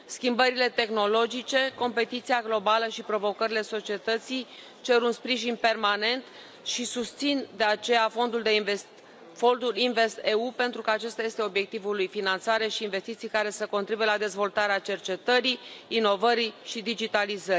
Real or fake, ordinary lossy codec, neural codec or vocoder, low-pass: real; none; none; none